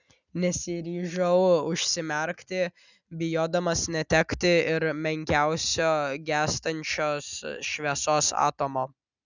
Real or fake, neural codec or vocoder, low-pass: real; none; 7.2 kHz